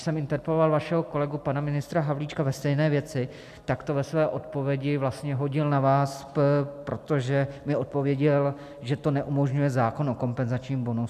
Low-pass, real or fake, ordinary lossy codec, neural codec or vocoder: 14.4 kHz; real; MP3, 96 kbps; none